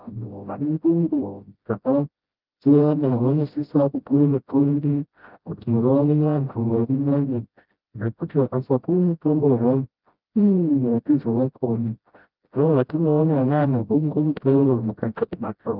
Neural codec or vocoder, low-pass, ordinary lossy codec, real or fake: codec, 16 kHz, 0.5 kbps, FreqCodec, smaller model; 5.4 kHz; Opus, 24 kbps; fake